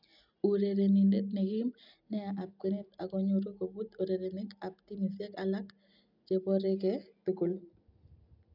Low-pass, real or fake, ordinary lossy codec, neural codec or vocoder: 5.4 kHz; real; none; none